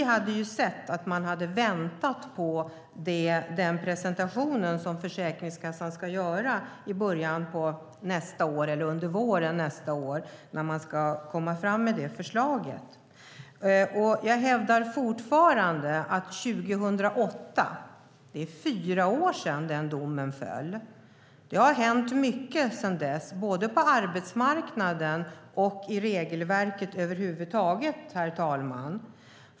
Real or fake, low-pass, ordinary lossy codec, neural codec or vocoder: real; none; none; none